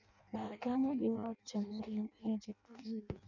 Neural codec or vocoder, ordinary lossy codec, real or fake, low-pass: codec, 16 kHz in and 24 kHz out, 0.6 kbps, FireRedTTS-2 codec; none; fake; 7.2 kHz